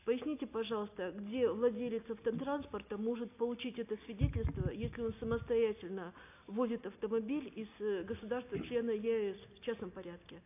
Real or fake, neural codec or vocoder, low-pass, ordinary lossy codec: real; none; 3.6 kHz; AAC, 32 kbps